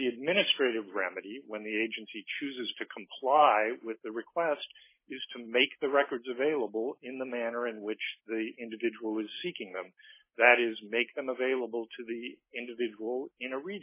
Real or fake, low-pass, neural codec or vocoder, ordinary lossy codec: real; 3.6 kHz; none; MP3, 16 kbps